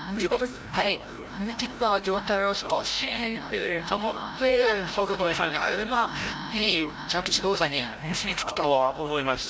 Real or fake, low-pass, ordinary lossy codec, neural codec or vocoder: fake; none; none; codec, 16 kHz, 0.5 kbps, FreqCodec, larger model